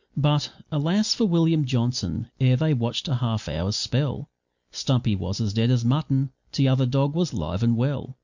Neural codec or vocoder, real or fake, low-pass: none; real; 7.2 kHz